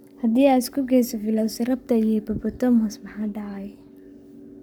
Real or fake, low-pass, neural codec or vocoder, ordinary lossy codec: fake; 19.8 kHz; codec, 44.1 kHz, 7.8 kbps, Pupu-Codec; none